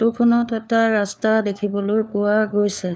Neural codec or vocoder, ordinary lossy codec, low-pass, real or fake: codec, 16 kHz, 4 kbps, FunCodec, trained on LibriTTS, 50 frames a second; none; none; fake